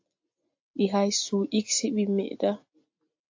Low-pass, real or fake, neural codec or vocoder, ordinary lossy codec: 7.2 kHz; real; none; AAC, 48 kbps